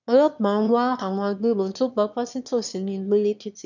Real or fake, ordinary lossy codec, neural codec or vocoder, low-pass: fake; none; autoencoder, 22.05 kHz, a latent of 192 numbers a frame, VITS, trained on one speaker; 7.2 kHz